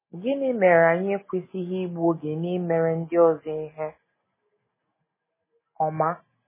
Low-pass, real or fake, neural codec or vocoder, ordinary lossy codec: 3.6 kHz; real; none; MP3, 16 kbps